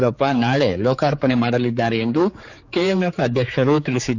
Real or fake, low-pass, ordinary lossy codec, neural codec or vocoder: fake; 7.2 kHz; none; codec, 16 kHz, 4 kbps, X-Codec, HuBERT features, trained on general audio